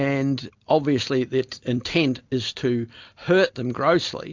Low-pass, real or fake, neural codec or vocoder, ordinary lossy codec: 7.2 kHz; real; none; MP3, 64 kbps